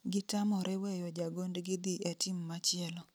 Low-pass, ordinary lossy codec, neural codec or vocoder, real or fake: none; none; none; real